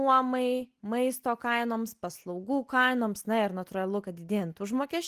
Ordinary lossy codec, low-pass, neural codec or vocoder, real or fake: Opus, 24 kbps; 14.4 kHz; none; real